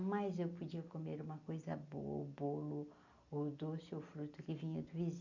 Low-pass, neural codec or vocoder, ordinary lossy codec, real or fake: 7.2 kHz; none; none; real